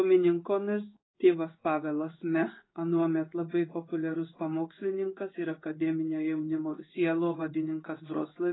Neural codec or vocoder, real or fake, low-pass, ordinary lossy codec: codec, 16 kHz in and 24 kHz out, 1 kbps, XY-Tokenizer; fake; 7.2 kHz; AAC, 16 kbps